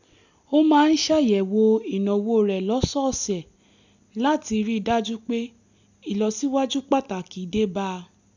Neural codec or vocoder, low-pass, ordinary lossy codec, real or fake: none; 7.2 kHz; none; real